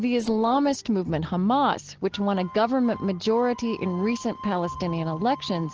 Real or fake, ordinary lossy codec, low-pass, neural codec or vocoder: real; Opus, 16 kbps; 7.2 kHz; none